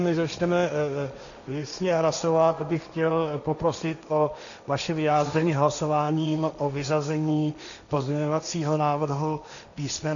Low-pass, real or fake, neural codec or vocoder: 7.2 kHz; fake; codec, 16 kHz, 1.1 kbps, Voila-Tokenizer